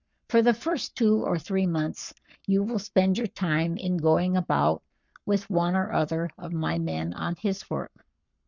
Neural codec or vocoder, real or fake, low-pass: codec, 44.1 kHz, 7.8 kbps, Pupu-Codec; fake; 7.2 kHz